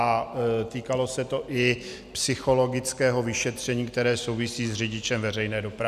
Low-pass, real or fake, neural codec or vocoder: 14.4 kHz; real; none